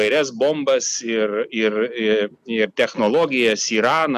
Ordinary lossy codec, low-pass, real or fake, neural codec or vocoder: AAC, 96 kbps; 14.4 kHz; real; none